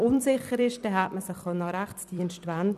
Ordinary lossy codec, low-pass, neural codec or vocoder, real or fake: none; 14.4 kHz; vocoder, 44.1 kHz, 128 mel bands every 256 samples, BigVGAN v2; fake